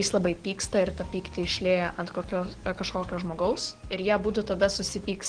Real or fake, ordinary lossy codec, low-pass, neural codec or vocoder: fake; Opus, 16 kbps; 9.9 kHz; codec, 44.1 kHz, 7.8 kbps, DAC